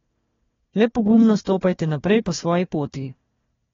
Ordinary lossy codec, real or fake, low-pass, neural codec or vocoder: AAC, 24 kbps; fake; 7.2 kHz; codec, 16 kHz, 1 kbps, FunCodec, trained on Chinese and English, 50 frames a second